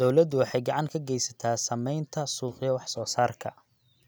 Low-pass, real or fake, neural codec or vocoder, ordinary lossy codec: none; real; none; none